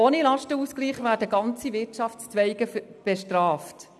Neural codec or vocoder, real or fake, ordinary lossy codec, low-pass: none; real; none; none